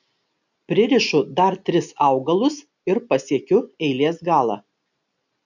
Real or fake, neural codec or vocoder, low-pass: real; none; 7.2 kHz